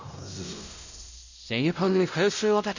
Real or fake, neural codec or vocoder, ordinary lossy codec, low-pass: fake; codec, 16 kHz, 0.5 kbps, X-Codec, WavLM features, trained on Multilingual LibriSpeech; none; 7.2 kHz